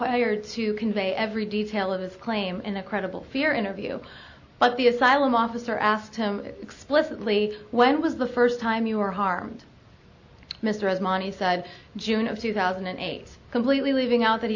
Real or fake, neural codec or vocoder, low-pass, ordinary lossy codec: real; none; 7.2 kHz; AAC, 48 kbps